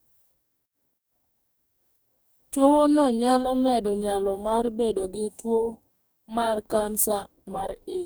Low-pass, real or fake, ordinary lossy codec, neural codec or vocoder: none; fake; none; codec, 44.1 kHz, 2.6 kbps, DAC